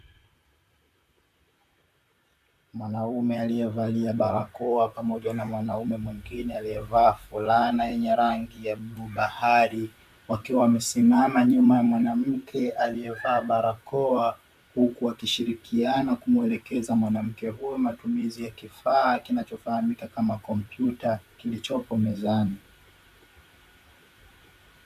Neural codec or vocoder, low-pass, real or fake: vocoder, 44.1 kHz, 128 mel bands, Pupu-Vocoder; 14.4 kHz; fake